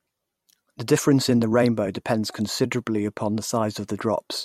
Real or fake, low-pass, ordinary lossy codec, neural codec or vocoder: fake; 19.8 kHz; MP3, 64 kbps; vocoder, 44.1 kHz, 128 mel bands every 256 samples, BigVGAN v2